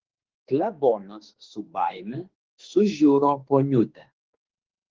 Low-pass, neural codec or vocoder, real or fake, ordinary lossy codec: 7.2 kHz; autoencoder, 48 kHz, 32 numbers a frame, DAC-VAE, trained on Japanese speech; fake; Opus, 16 kbps